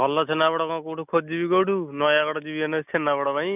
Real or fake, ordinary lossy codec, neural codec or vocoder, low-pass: real; none; none; 3.6 kHz